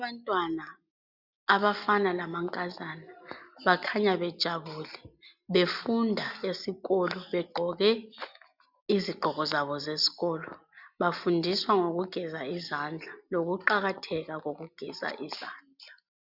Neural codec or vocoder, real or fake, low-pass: none; real; 5.4 kHz